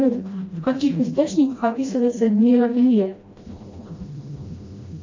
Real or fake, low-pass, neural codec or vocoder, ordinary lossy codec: fake; 7.2 kHz; codec, 16 kHz, 1 kbps, FreqCodec, smaller model; AAC, 48 kbps